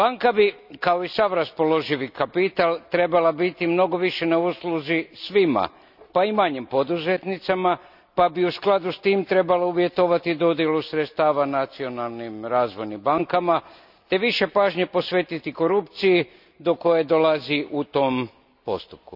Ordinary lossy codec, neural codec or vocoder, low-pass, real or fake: none; none; 5.4 kHz; real